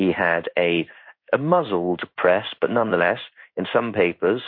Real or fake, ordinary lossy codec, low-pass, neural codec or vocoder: fake; MP3, 32 kbps; 5.4 kHz; codec, 16 kHz in and 24 kHz out, 1 kbps, XY-Tokenizer